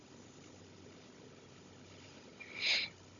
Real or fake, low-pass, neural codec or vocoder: fake; 7.2 kHz; codec, 16 kHz, 16 kbps, FunCodec, trained on Chinese and English, 50 frames a second